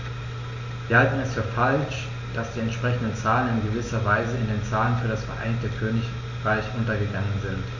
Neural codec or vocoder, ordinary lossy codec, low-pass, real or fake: none; none; 7.2 kHz; real